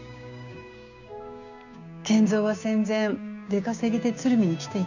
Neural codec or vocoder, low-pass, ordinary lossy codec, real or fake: codec, 16 kHz, 6 kbps, DAC; 7.2 kHz; none; fake